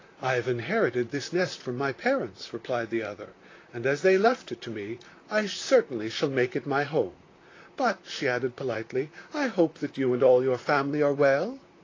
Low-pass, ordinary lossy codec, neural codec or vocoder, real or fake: 7.2 kHz; AAC, 32 kbps; vocoder, 44.1 kHz, 128 mel bands, Pupu-Vocoder; fake